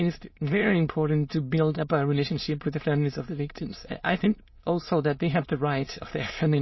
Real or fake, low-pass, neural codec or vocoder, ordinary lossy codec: fake; 7.2 kHz; autoencoder, 22.05 kHz, a latent of 192 numbers a frame, VITS, trained on many speakers; MP3, 24 kbps